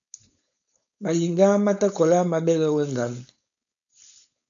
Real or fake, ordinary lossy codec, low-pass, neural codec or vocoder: fake; MP3, 96 kbps; 7.2 kHz; codec, 16 kHz, 4.8 kbps, FACodec